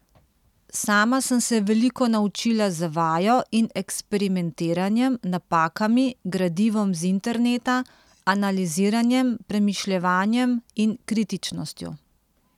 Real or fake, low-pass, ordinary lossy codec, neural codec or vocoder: real; 19.8 kHz; none; none